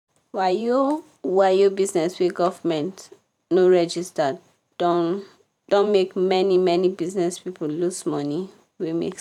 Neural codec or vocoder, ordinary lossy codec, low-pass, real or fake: vocoder, 44.1 kHz, 128 mel bands every 512 samples, BigVGAN v2; none; 19.8 kHz; fake